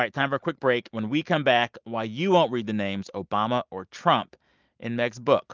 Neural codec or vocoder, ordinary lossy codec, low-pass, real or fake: none; Opus, 24 kbps; 7.2 kHz; real